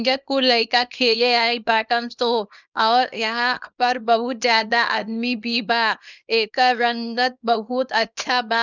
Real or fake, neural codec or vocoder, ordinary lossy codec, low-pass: fake; codec, 24 kHz, 0.9 kbps, WavTokenizer, small release; none; 7.2 kHz